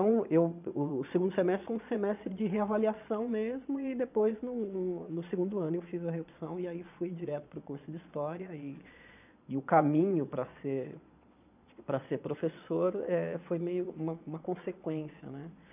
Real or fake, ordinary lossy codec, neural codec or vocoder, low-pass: fake; none; vocoder, 22.05 kHz, 80 mel bands, Vocos; 3.6 kHz